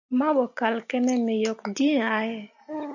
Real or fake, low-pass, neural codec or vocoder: real; 7.2 kHz; none